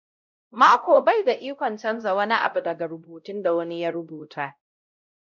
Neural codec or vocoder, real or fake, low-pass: codec, 16 kHz, 0.5 kbps, X-Codec, WavLM features, trained on Multilingual LibriSpeech; fake; 7.2 kHz